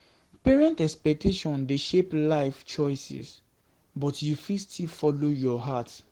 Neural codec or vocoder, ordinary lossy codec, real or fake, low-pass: codec, 44.1 kHz, 7.8 kbps, Pupu-Codec; Opus, 16 kbps; fake; 19.8 kHz